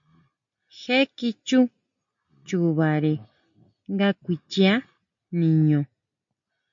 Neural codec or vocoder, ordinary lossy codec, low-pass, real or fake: none; MP3, 48 kbps; 7.2 kHz; real